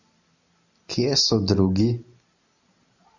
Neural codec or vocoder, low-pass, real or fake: none; 7.2 kHz; real